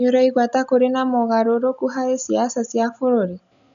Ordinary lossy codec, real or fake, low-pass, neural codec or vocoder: none; real; 7.2 kHz; none